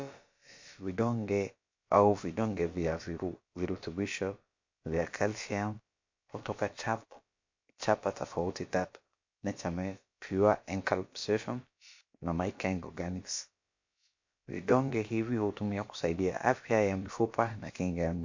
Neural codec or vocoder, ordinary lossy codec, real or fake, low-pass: codec, 16 kHz, about 1 kbps, DyCAST, with the encoder's durations; MP3, 48 kbps; fake; 7.2 kHz